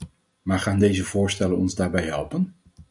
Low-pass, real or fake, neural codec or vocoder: 10.8 kHz; real; none